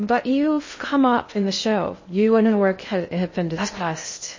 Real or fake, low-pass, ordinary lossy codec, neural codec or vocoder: fake; 7.2 kHz; MP3, 32 kbps; codec, 16 kHz in and 24 kHz out, 0.6 kbps, FocalCodec, streaming, 2048 codes